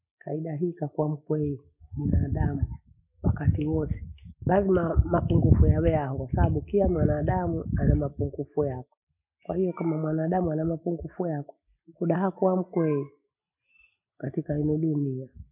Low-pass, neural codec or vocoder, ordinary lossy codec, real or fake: 3.6 kHz; none; none; real